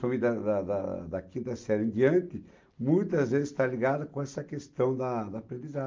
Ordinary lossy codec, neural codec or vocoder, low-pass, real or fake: Opus, 24 kbps; none; 7.2 kHz; real